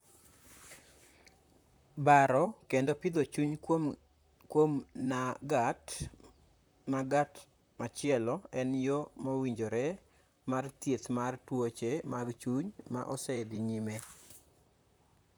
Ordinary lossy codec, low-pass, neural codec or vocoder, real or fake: none; none; vocoder, 44.1 kHz, 128 mel bands, Pupu-Vocoder; fake